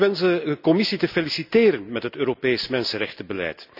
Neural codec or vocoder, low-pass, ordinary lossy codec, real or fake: none; 5.4 kHz; none; real